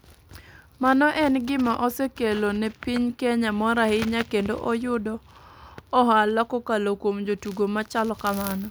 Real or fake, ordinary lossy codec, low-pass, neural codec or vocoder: real; none; none; none